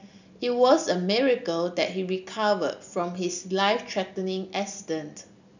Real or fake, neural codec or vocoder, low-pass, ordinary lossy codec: real; none; 7.2 kHz; none